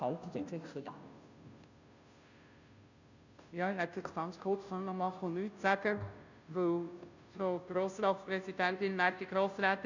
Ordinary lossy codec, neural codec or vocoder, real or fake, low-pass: none; codec, 16 kHz, 0.5 kbps, FunCodec, trained on Chinese and English, 25 frames a second; fake; 7.2 kHz